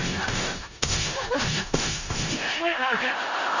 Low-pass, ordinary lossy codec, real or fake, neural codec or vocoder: 7.2 kHz; none; fake; codec, 16 kHz in and 24 kHz out, 0.4 kbps, LongCat-Audio-Codec, four codebook decoder